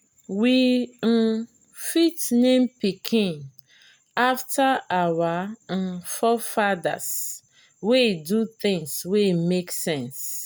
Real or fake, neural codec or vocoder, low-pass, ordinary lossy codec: real; none; none; none